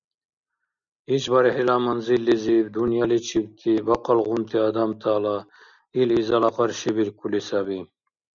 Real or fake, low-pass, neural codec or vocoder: real; 7.2 kHz; none